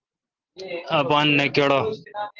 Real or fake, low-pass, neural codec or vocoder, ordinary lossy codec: real; 7.2 kHz; none; Opus, 16 kbps